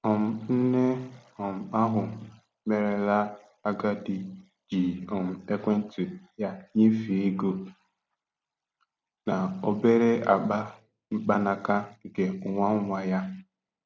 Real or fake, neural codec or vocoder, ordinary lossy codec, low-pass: real; none; none; none